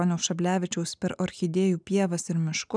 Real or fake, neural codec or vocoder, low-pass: real; none; 9.9 kHz